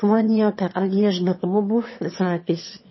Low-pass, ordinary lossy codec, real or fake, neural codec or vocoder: 7.2 kHz; MP3, 24 kbps; fake; autoencoder, 22.05 kHz, a latent of 192 numbers a frame, VITS, trained on one speaker